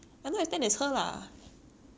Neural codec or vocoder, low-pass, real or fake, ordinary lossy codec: none; none; real; none